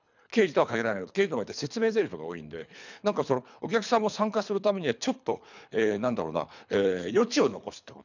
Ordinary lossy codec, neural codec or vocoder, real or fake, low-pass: none; codec, 24 kHz, 3 kbps, HILCodec; fake; 7.2 kHz